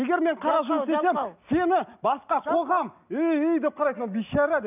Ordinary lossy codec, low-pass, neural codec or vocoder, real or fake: none; 3.6 kHz; none; real